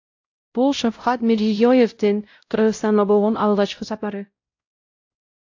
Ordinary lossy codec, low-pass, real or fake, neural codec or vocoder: AAC, 48 kbps; 7.2 kHz; fake; codec, 16 kHz, 0.5 kbps, X-Codec, WavLM features, trained on Multilingual LibriSpeech